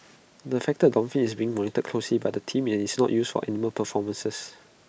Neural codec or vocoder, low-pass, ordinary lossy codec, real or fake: none; none; none; real